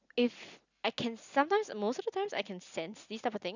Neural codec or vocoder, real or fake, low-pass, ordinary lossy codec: none; real; 7.2 kHz; none